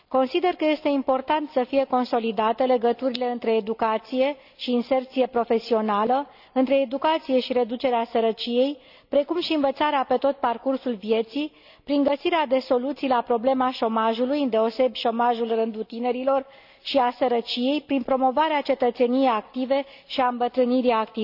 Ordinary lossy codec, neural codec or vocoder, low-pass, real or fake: none; none; 5.4 kHz; real